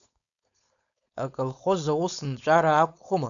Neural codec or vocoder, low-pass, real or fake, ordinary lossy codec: codec, 16 kHz, 4.8 kbps, FACodec; 7.2 kHz; fake; MP3, 96 kbps